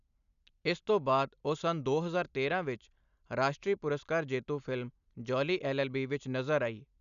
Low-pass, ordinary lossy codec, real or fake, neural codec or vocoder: 7.2 kHz; none; real; none